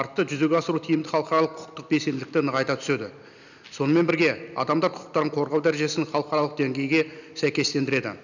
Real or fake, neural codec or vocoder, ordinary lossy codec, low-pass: real; none; none; 7.2 kHz